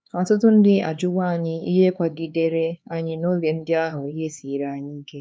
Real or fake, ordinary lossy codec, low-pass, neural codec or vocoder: fake; none; none; codec, 16 kHz, 4 kbps, X-Codec, HuBERT features, trained on LibriSpeech